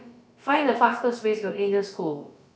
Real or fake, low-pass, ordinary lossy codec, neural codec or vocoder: fake; none; none; codec, 16 kHz, about 1 kbps, DyCAST, with the encoder's durations